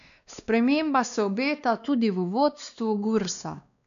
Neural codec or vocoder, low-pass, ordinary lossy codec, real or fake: codec, 16 kHz, 2 kbps, X-Codec, WavLM features, trained on Multilingual LibriSpeech; 7.2 kHz; MP3, 96 kbps; fake